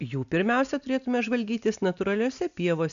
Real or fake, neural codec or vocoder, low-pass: real; none; 7.2 kHz